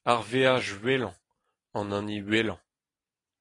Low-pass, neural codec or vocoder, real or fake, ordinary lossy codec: 10.8 kHz; none; real; AAC, 32 kbps